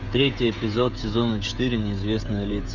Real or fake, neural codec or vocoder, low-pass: fake; codec, 16 kHz, 16 kbps, FreqCodec, smaller model; 7.2 kHz